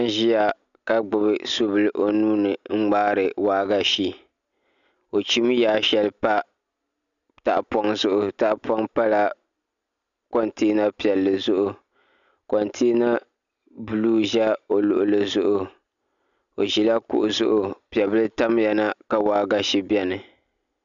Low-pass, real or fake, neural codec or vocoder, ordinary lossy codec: 7.2 kHz; real; none; MP3, 96 kbps